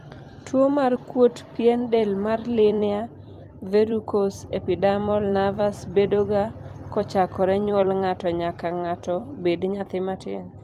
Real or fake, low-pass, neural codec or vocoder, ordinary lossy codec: fake; 14.4 kHz; vocoder, 44.1 kHz, 128 mel bands every 256 samples, BigVGAN v2; Opus, 32 kbps